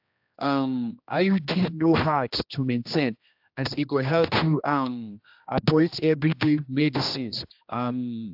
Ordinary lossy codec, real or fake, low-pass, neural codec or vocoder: AAC, 48 kbps; fake; 5.4 kHz; codec, 16 kHz, 1 kbps, X-Codec, HuBERT features, trained on general audio